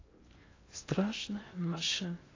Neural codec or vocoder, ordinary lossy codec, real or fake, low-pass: codec, 16 kHz in and 24 kHz out, 0.6 kbps, FocalCodec, streaming, 2048 codes; AAC, 32 kbps; fake; 7.2 kHz